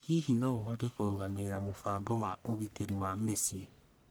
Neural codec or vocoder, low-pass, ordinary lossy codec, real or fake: codec, 44.1 kHz, 1.7 kbps, Pupu-Codec; none; none; fake